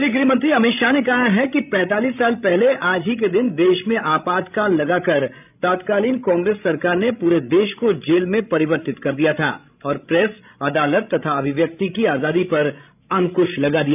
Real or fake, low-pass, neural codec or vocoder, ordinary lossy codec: fake; 3.6 kHz; codec, 16 kHz, 16 kbps, FreqCodec, larger model; none